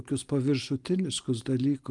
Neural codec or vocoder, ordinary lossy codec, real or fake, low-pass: none; Opus, 32 kbps; real; 10.8 kHz